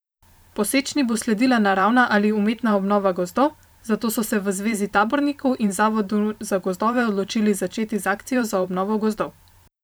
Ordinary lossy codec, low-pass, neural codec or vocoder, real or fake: none; none; vocoder, 44.1 kHz, 128 mel bands every 512 samples, BigVGAN v2; fake